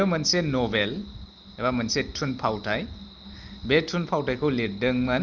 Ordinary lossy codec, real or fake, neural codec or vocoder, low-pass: Opus, 24 kbps; real; none; 7.2 kHz